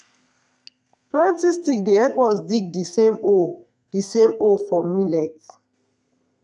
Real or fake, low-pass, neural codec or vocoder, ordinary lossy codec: fake; 10.8 kHz; codec, 32 kHz, 1.9 kbps, SNAC; none